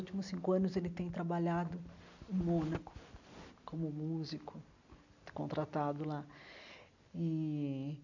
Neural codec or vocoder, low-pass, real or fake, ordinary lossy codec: none; 7.2 kHz; real; none